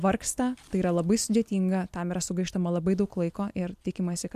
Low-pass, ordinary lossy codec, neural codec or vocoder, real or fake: 14.4 kHz; MP3, 96 kbps; none; real